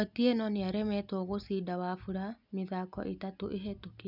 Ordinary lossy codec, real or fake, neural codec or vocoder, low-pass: none; real; none; 5.4 kHz